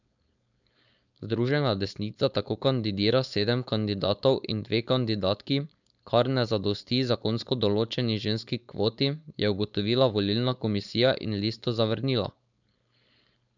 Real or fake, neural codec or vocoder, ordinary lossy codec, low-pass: fake; codec, 16 kHz, 4.8 kbps, FACodec; none; 7.2 kHz